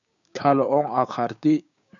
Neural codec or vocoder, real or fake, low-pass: codec, 16 kHz, 6 kbps, DAC; fake; 7.2 kHz